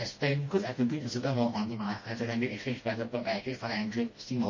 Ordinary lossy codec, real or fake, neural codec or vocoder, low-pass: MP3, 32 kbps; fake; codec, 16 kHz, 1 kbps, FreqCodec, smaller model; 7.2 kHz